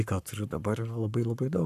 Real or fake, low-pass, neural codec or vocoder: fake; 14.4 kHz; codec, 44.1 kHz, 7.8 kbps, Pupu-Codec